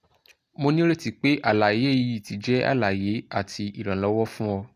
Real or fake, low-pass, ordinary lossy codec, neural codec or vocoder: real; 14.4 kHz; AAC, 64 kbps; none